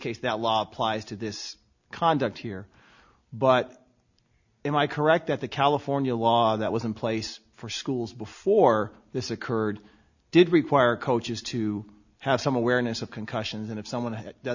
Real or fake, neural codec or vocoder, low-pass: real; none; 7.2 kHz